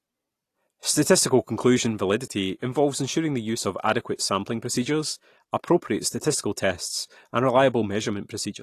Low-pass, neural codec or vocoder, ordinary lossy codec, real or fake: 14.4 kHz; none; AAC, 48 kbps; real